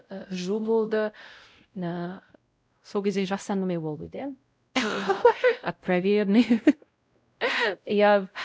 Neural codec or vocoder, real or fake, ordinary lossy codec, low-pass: codec, 16 kHz, 0.5 kbps, X-Codec, WavLM features, trained on Multilingual LibriSpeech; fake; none; none